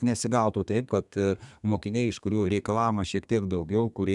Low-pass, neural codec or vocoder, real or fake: 10.8 kHz; codec, 32 kHz, 1.9 kbps, SNAC; fake